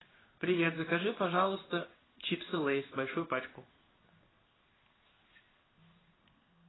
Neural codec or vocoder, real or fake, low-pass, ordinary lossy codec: codec, 16 kHz in and 24 kHz out, 1 kbps, XY-Tokenizer; fake; 7.2 kHz; AAC, 16 kbps